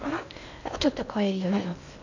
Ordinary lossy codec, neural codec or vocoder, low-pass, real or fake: none; codec, 16 kHz in and 24 kHz out, 0.6 kbps, FocalCodec, streaming, 2048 codes; 7.2 kHz; fake